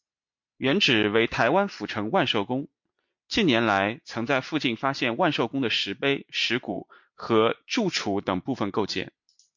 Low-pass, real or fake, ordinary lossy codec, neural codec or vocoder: 7.2 kHz; real; MP3, 48 kbps; none